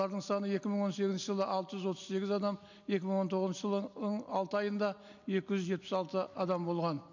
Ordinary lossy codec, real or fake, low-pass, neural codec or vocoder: none; real; 7.2 kHz; none